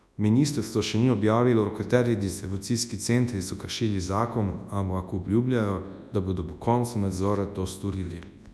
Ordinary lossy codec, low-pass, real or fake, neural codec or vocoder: none; none; fake; codec, 24 kHz, 0.9 kbps, WavTokenizer, large speech release